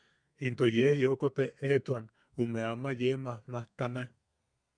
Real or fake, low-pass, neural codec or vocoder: fake; 9.9 kHz; codec, 32 kHz, 1.9 kbps, SNAC